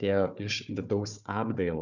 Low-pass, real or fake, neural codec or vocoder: 7.2 kHz; fake; codec, 16 kHz, 4 kbps, FunCodec, trained on Chinese and English, 50 frames a second